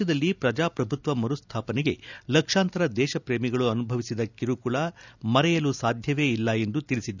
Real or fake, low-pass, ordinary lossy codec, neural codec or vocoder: real; 7.2 kHz; none; none